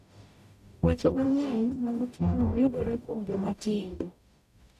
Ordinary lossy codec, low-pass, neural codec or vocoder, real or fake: none; 14.4 kHz; codec, 44.1 kHz, 0.9 kbps, DAC; fake